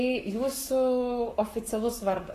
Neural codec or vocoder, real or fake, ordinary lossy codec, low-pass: codec, 44.1 kHz, 7.8 kbps, Pupu-Codec; fake; AAC, 48 kbps; 14.4 kHz